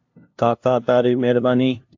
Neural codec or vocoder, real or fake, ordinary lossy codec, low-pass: codec, 16 kHz, 0.5 kbps, FunCodec, trained on LibriTTS, 25 frames a second; fake; AAC, 48 kbps; 7.2 kHz